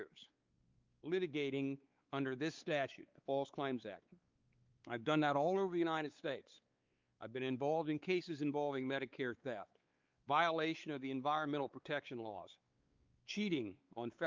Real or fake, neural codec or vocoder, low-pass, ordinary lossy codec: fake; codec, 16 kHz, 4 kbps, X-Codec, WavLM features, trained on Multilingual LibriSpeech; 7.2 kHz; Opus, 24 kbps